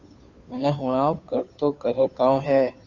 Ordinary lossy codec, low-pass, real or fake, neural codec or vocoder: Opus, 64 kbps; 7.2 kHz; fake; codec, 16 kHz, 2 kbps, FunCodec, trained on Chinese and English, 25 frames a second